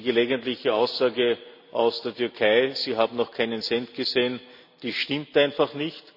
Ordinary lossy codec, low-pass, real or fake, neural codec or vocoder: none; 5.4 kHz; real; none